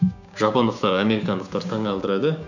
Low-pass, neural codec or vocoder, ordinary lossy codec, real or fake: 7.2 kHz; codec, 16 kHz, 6 kbps, DAC; none; fake